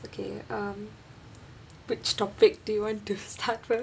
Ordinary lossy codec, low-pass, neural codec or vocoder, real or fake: none; none; none; real